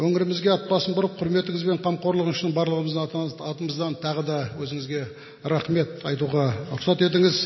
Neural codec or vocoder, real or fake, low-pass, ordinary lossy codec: none; real; 7.2 kHz; MP3, 24 kbps